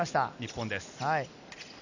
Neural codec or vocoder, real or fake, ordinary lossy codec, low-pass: none; real; none; 7.2 kHz